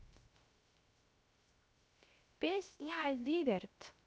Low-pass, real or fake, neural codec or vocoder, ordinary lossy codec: none; fake; codec, 16 kHz, 0.3 kbps, FocalCodec; none